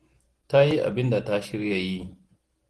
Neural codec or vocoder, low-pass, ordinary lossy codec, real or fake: none; 10.8 kHz; Opus, 16 kbps; real